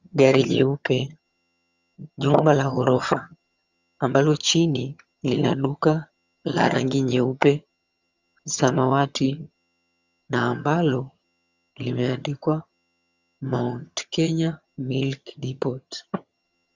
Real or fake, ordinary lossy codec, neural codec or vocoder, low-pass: fake; Opus, 64 kbps; vocoder, 22.05 kHz, 80 mel bands, HiFi-GAN; 7.2 kHz